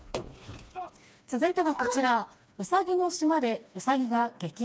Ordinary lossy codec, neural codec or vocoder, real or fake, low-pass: none; codec, 16 kHz, 2 kbps, FreqCodec, smaller model; fake; none